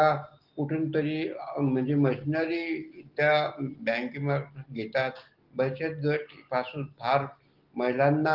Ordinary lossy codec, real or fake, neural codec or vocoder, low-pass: Opus, 16 kbps; real; none; 5.4 kHz